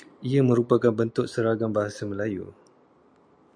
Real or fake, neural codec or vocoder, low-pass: real; none; 9.9 kHz